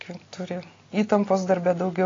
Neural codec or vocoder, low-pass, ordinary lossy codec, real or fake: none; 7.2 kHz; AAC, 32 kbps; real